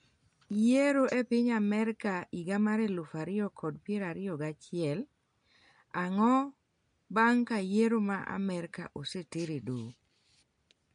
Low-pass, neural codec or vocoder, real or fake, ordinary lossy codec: 9.9 kHz; none; real; MP3, 64 kbps